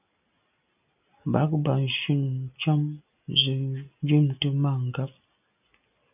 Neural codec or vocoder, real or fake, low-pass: none; real; 3.6 kHz